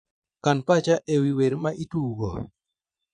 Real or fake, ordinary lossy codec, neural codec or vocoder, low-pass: fake; none; vocoder, 22.05 kHz, 80 mel bands, Vocos; 9.9 kHz